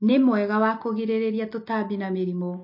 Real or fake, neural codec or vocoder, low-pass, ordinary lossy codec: real; none; 5.4 kHz; MP3, 32 kbps